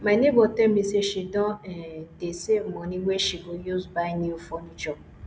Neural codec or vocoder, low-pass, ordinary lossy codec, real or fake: none; none; none; real